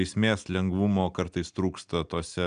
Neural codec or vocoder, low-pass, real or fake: none; 9.9 kHz; real